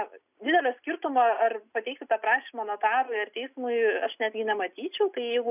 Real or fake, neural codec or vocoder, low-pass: real; none; 3.6 kHz